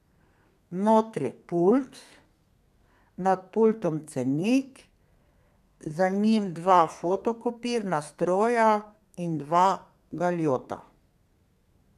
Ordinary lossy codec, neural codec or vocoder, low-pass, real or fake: none; codec, 32 kHz, 1.9 kbps, SNAC; 14.4 kHz; fake